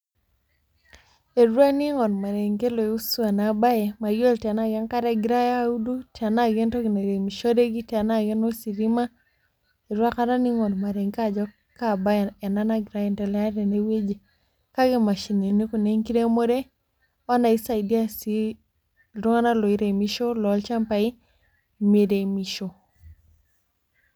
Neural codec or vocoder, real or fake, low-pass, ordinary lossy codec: none; real; none; none